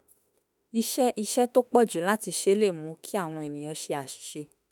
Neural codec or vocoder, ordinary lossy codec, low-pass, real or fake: autoencoder, 48 kHz, 32 numbers a frame, DAC-VAE, trained on Japanese speech; none; 19.8 kHz; fake